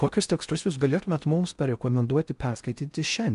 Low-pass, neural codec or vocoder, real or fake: 10.8 kHz; codec, 16 kHz in and 24 kHz out, 0.6 kbps, FocalCodec, streaming, 4096 codes; fake